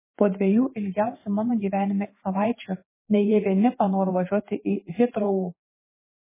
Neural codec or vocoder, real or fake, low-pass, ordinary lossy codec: vocoder, 44.1 kHz, 128 mel bands every 512 samples, BigVGAN v2; fake; 3.6 kHz; MP3, 16 kbps